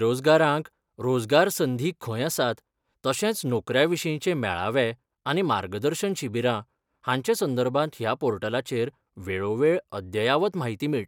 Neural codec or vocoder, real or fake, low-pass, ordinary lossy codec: none; real; 19.8 kHz; none